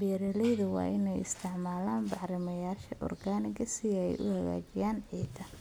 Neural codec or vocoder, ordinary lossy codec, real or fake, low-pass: none; none; real; none